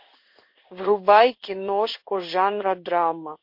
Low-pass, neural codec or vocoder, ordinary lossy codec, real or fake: 5.4 kHz; codec, 16 kHz in and 24 kHz out, 1 kbps, XY-Tokenizer; MP3, 32 kbps; fake